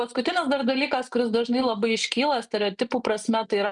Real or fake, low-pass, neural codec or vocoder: real; 10.8 kHz; none